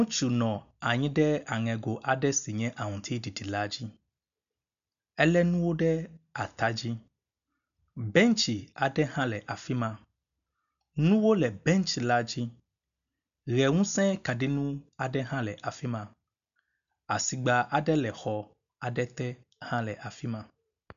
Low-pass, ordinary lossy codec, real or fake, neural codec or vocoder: 7.2 kHz; MP3, 96 kbps; real; none